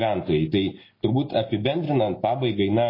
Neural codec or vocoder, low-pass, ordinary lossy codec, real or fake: none; 5.4 kHz; MP3, 24 kbps; real